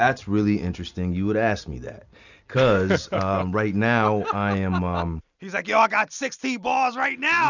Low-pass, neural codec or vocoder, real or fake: 7.2 kHz; none; real